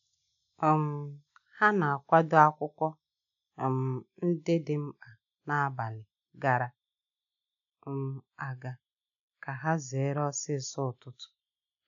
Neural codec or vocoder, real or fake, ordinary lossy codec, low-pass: none; real; none; 7.2 kHz